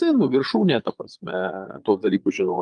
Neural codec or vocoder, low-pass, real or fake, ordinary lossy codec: vocoder, 22.05 kHz, 80 mel bands, Vocos; 9.9 kHz; fake; Opus, 32 kbps